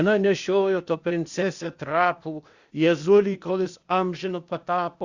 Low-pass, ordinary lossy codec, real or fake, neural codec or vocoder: 7.2 kHz; Opus, 64 kbps; fake; codec, 16 kHz, 0.8 kbps, ZipCodec